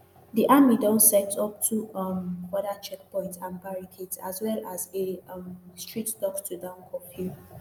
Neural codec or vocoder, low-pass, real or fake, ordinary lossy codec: none; none; real; none